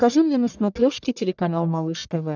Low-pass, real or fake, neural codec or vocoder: 7.2 kHz; fake; codec, 44.1 kHz, 1.7 kbps, Pupu-Codec